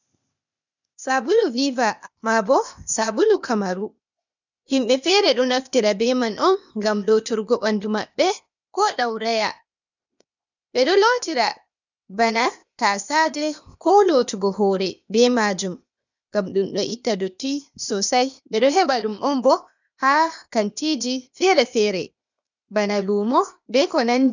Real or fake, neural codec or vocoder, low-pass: fake; codec, 16 kHz, 0.8 kbps, ZipCodec; 7.2 kHz